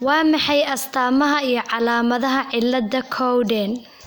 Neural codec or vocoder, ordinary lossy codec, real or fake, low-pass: none; none; real; none